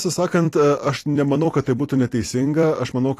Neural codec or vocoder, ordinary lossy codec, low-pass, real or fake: vocoder, 44.1 kHz, 128 mel bands every 256 samples, BigVGAN v2; AAC, 48 kbps; 14.4 kHz; fake